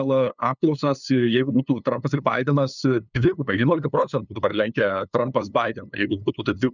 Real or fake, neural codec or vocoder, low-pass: fake; codec, 16 kHz, 2 kbps, FunCodec, trained on LibriTTS, 25 frames a second; 7.2 kHz